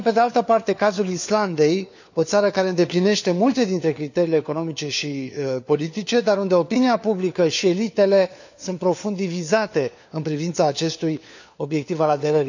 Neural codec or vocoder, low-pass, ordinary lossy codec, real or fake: codec, 16 kHz, 4 kbps, FunCodec, trained on LibriTTS, 50 frames a second; 7.2 kHz; none; fake